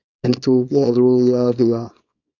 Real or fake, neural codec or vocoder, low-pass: fake; codec, 24 kHz, 0.9 kbps, WavTokenizer, small release; 7.2 kHz